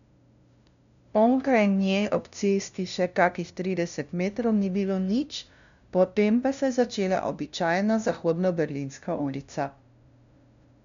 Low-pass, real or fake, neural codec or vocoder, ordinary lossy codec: 7.2 kHz; fake; codec, 16 kHz, 0.5 kbps, FunCodec, trained on LibriTTS, 25 frames a second; MP3, 96 kbps